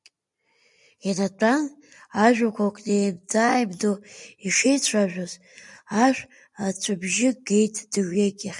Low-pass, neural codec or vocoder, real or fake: 10.8 kHz; none; real